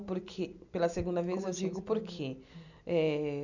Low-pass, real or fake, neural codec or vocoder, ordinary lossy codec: 7.2 kHz; real; none; none